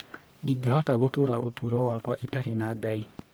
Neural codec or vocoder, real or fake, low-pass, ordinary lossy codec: codec, 44.1 kHz, 1.7 kbps, Pupu-Codec; fake; none; none